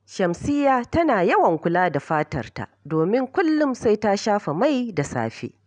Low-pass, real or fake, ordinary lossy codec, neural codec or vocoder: 9.9 kHz; real; none; none